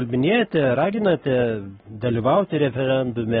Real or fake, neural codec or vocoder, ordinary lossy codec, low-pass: fake; codec, 44.1 kHz, 7.8 kbps, Pupu-Codec; AAC, 16 kbps; 19.8 kHz